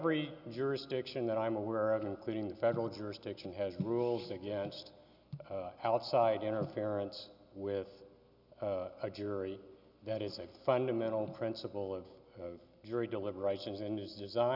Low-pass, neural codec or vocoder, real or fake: 5.4 kHz; none; real